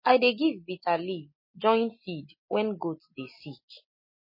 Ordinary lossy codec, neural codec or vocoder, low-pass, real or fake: MP3, 24 kbps; none; 5.4 kHz; real